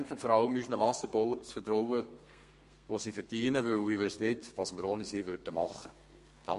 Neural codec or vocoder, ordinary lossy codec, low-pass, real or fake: codec, 32 kHz, 1.9 kbps, SNAC; MP3, 48 kbps; 14.4 kHz; fake